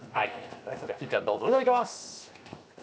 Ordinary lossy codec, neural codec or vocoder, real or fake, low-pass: none; codec, 16 kHz, 0.7 kbps, FocalCodec; fake; none